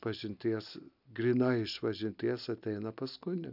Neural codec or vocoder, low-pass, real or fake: none; 5.4 kHz; real